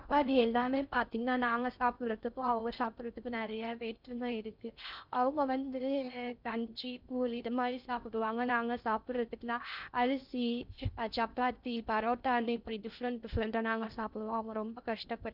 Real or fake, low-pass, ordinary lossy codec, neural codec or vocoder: fake; 5.4 kHz; none; codec, 16 kHz in and 24 kHz out, 0.6 kbps, FocalCodec, streaming, 4096 codes